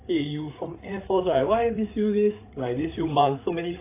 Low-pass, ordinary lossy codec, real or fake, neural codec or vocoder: 3.6 kHz; AAC, 24 kbps; fake; codec, 16 kHz, 8 kbps, FreqCodec, larger model